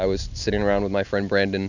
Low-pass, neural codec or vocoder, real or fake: 7.2 kHz; none; real